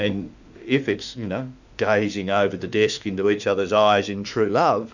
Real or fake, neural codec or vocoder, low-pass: fake; autoencoder, 48 kHz, 32 numbers a frame, DAC-VAE, trained on Japanese speech; 7.2 kHz